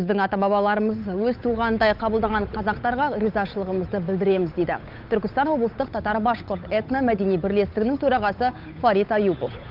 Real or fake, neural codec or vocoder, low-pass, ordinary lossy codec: fake; codec, 16 kHz, 8 kbps, FunCodec, trained on Chinese and English, 25 frames a second; 5.4 kHz; Opus, 24 kbps